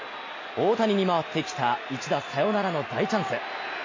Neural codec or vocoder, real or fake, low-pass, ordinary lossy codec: none; real; 7.2 kHz; MP3, 32 kbps